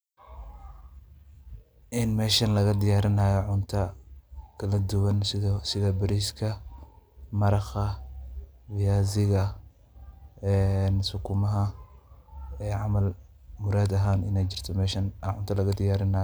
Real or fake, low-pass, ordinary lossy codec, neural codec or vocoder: real; none; none; none